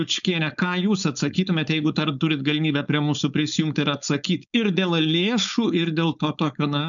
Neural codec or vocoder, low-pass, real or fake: codec, 16 kHz, 4.8 kbps, FACodec; 7.2 kHz; fake